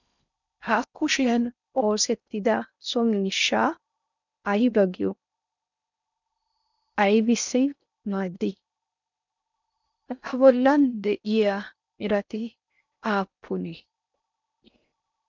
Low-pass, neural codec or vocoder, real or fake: 7.2 kHz; codec, 16 kHz in and 24 kHz out, 0.6 kbps, FocalCodec, streaming, 4096 codes; fake